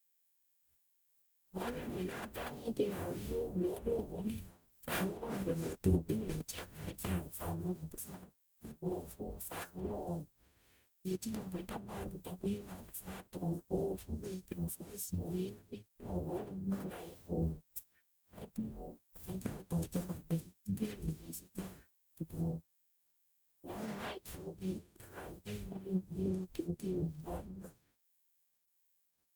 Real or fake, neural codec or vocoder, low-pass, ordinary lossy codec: fake; codec, 44.1 kHz, 0.9 kbps, DAC; none; none